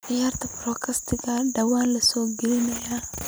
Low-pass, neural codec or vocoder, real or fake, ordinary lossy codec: none; none; real; none